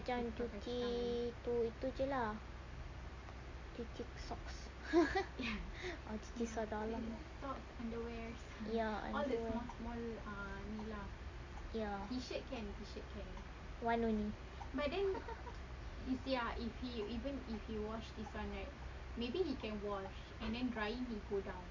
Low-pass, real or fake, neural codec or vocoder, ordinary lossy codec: 7.2 kHz; real; none; MP3, 64 kbps